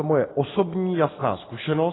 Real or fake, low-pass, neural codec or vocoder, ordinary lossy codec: real; 7.2 kHz; none; AAC, 16 kbps